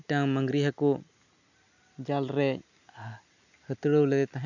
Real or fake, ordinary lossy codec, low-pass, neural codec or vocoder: real; none; 7.2 kHz; none